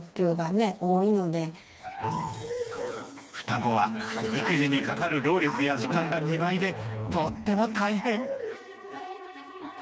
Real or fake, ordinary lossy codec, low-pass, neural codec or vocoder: fake; none; none; codec, 16 kHz, 2 kbps, FreqCodec, smaller model